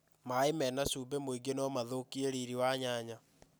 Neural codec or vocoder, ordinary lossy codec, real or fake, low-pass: none; none; real; none